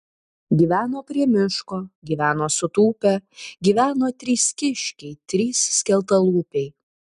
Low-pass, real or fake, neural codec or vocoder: 10.8 kHz; real; none